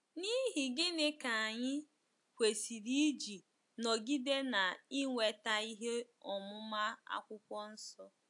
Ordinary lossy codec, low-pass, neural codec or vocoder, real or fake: AAC, 64 kbps; 10.8 kHz; none; real